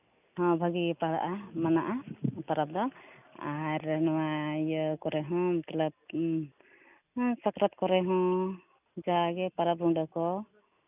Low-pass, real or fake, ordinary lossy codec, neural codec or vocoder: 3.6 kHz; real; none; none